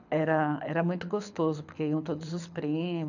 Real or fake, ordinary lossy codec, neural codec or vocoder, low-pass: fake; none; codec, 24 kHz, 6 kbps, HILCodec; 7.2 kHz